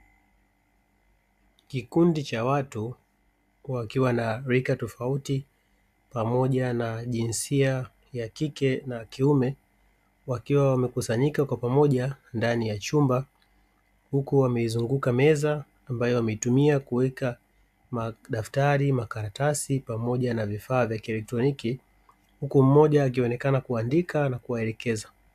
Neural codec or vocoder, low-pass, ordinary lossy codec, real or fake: none; 14.4 kHz; AAC, 96 kbps; real